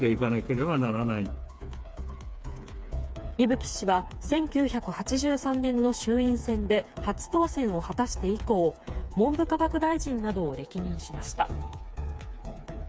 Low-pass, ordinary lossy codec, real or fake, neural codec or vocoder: none; none; fake; codec, 16 kHz, 4 kbps, FreqCodec, smaller model